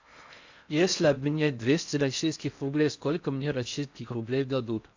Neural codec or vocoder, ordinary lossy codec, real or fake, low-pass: codec, 16 kHz in and 24 kHz out, 0.6 kbps, FocalCodec, streaming, 4096 codes; MP3, 64 kbps; fake; 7.2 kHz